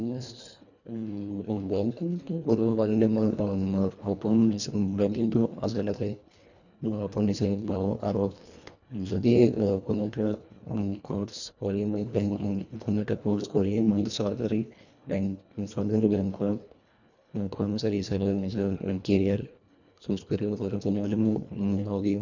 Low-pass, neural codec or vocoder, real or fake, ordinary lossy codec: 7.2 kHz; codec, 24 kHz, 1.5 kbps, HILCodec; fake; none